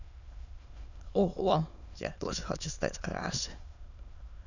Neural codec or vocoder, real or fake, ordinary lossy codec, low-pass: autoencoder, 22.05 kHz, a latent of 192 numbers a frame, VITS, trained on many speakers; fake; none; 7.2 kHz